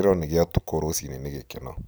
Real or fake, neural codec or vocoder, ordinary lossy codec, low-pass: fake; vocoder, 44.1 kHz, 128 mel bands every 256 samples, BigVGAN v2; none; none